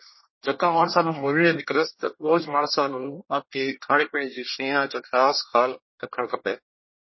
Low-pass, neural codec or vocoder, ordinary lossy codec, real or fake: 7.2 kHz; codec, 24 kHz, 1 kbps, SNAC; MP3, 24 kbps; fake